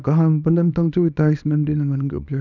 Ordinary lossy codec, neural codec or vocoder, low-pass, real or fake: none; codec, 24 kHz, 0.9 kbps, WavTokenizer, small release; 7.2 kHz; fake